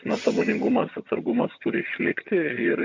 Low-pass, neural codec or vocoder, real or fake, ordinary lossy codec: 7.2 kHz; vocoder, 22.05 kHz, 80 mel bands, HiFi-GAN; fake; MP3, 48 kbps